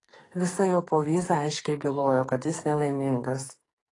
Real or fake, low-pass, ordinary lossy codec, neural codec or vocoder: fake; 10.8 kHz; AAC, 32 kbps; codec, 44.1 kHz, 2.6 kbps, SNAC